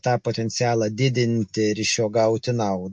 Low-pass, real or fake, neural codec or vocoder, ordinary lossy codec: 9.9 kHz; real; none; MP3, 48 kbps